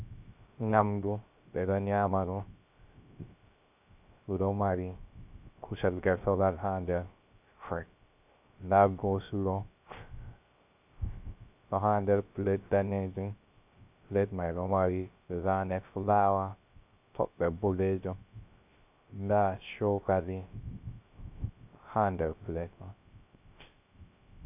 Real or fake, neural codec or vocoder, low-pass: fake; codec, 16 kHz, 0.3 kbps, FocalCodec; 3.6 kHz